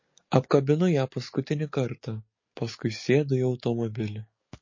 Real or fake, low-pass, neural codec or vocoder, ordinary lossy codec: fake; 7.2 kHz; codec, 44.1 kHz, 7.8 kbps, DAC; MP3, 32 kbps